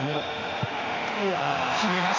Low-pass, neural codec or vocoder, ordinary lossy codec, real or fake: 7.2 kHz; autoencoder, 48 kHz, 32 numbers a frame, DAC-VAE, trained on Japanese speech; none; fake